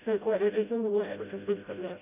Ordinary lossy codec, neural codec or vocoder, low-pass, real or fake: none; codec, 16 kHz, 0.5 kbps, FreqCodec, smaller model; 3.6 kHz; fake